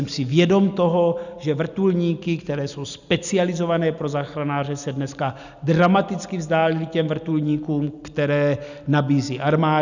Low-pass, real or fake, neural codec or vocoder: 7.2 kHz; real; none